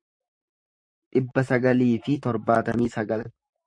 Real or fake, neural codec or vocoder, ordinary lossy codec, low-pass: fake; vocoder, 44.1 kHz, 128 mel bands, Pupu-Vocoder; MP3, 48 kbps; 9.9 kHz